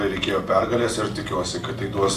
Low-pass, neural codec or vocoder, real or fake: 14.4 kHz; none; real